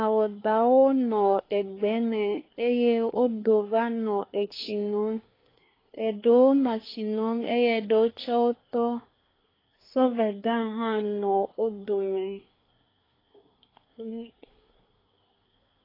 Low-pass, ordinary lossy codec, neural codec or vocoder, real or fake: 5.4 kHz; AAC, 24 kbps; codec, 24 kHz, 1 kbps, SNAC; fake